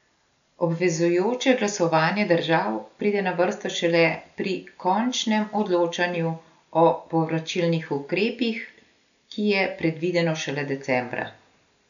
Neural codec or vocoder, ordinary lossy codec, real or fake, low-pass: none; none; real; 7.2 kHz